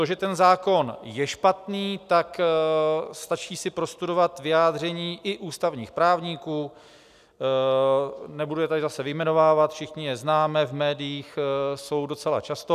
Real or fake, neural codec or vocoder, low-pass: real; none; 14.4 kHz